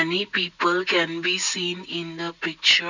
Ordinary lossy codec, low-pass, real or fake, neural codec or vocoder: none; 7.2 kHz; real; none